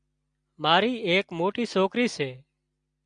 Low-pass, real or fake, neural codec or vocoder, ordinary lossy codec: 9.9 kHz; real; none; MP3, 96 kbps